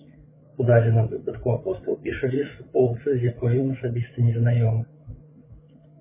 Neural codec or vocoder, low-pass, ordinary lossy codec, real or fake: codec, 16 kHz, 8 kbps, FreqCodec, larger model; 3.6 kHz; MP3, 16 kbps; fake